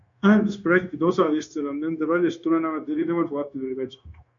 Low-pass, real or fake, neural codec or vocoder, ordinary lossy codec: 7.2 kHz; fake; codec, 16 kHz, 0.9 kbps, LongCat-Audio-Codec; MP3, 64 kbps